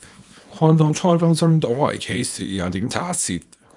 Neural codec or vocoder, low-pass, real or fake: codec, 24 kHz, 0.9 kbps, WavTokenizer, small release; 10.8 kHz; fake